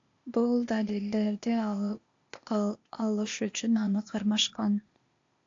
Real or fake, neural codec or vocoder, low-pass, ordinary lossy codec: fake; codec, 16 kHz, 0.8 kbps, ZipCodec; 7.2 kHz; AAC, 48 kbps